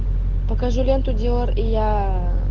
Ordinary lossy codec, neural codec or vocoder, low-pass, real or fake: Opus, 16 kbps; none; 7.2 kHz; real